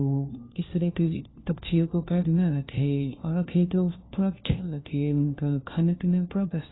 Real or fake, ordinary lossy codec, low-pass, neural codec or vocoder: fake; AAC, 16 kbps; 7.2 kHz; codec, 16 kHz, 1 kbps, FunCodec, trained on LibriTTS, 50 frames a second